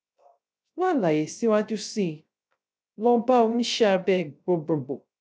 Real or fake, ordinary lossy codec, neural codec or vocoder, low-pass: fake; none; codec, 16 kHz, 0.3 kbps, FocalCodec; none